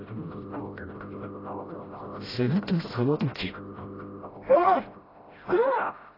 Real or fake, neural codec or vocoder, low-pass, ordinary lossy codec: fake; codec, 16 kHz, 0.5 kbps, FreqCodec, smaller model; 5.4 kHz; AAC, 24 kbps